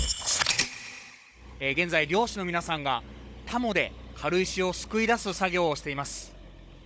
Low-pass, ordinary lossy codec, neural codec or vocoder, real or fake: none; none; codec, 16 kHz, 16 kbps, FunCodec, trained on Chinese and English, 50 frames a second; fake